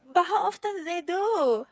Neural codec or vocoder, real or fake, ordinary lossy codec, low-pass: codec, 16 kHz, 4 kbps, FreqCodec, smaller model; fake; none; none